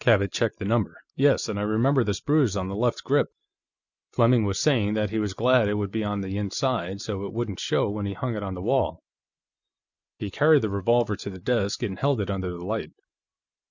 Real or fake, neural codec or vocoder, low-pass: real; none; 7.2 kHz